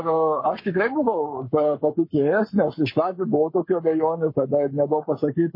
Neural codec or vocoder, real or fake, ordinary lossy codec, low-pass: codec, 44.1 kHz, 7.8 kbps, Pupu-Codec; fake; MP3, 24 kbps; 5.4 kHz